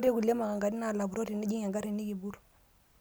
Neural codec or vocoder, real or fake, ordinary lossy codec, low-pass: none; real; none; none